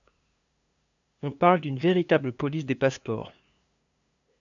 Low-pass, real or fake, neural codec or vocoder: 7.2 kHz; fake; codec, 16 kHz, 2 kbps, FunCodec, trained on LibriTTS, 25 frames a second